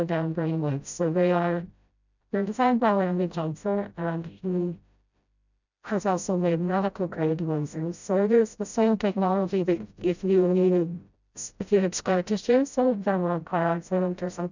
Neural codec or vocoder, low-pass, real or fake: codec, 16 kHz, 0.5 kbps, FreqCodec, smaller model; 7.2 kHz; fake